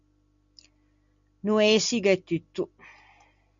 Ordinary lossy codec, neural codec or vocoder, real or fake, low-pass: MP3, 64 kbps; none; real; 7.2 kHz